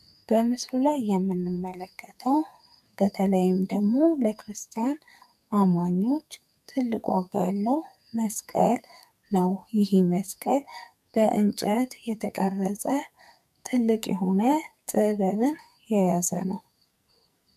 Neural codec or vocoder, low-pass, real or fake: codec, 44.1 kHz, 2.6 kbps, SNAC; 14.4 kHz; fake